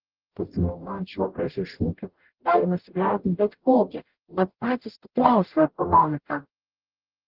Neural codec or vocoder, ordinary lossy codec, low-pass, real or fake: codec, 44.1 kHz, 0.9 kbps, DAC; Opus, 16 kbps; 5.4 kHz; fake